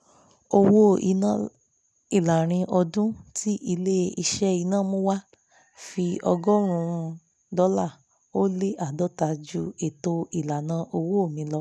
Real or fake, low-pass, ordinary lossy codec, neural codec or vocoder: real; none; none; none